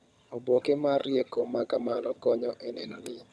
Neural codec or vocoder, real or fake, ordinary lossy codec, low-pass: vocoder, 22.05 kHz, 80 mel bands, HiFi-GAN; fake; none; none